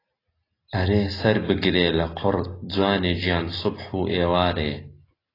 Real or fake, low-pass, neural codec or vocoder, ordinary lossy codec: real; 5.4 kHz; none; AAC, 24 kbps